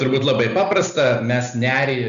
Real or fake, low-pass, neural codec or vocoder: real; 7.2 kHz; none